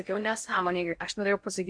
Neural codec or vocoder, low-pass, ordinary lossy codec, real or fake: codec, 16 kHz in and 24 kHz out, 0.6 kbps, FocalCodec, streaming, 4096 codes; 9.9 kHz; MP3, 64 kbps; fake